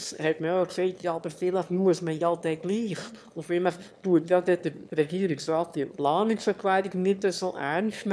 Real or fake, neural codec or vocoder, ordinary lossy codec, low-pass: fake; autoencoder, 22.05 kHz, a latent of 192 numbers a frame, VITS, trained on one speaker; none; none